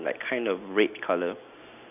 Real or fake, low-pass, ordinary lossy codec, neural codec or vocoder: real; 3.6 kHz; none; none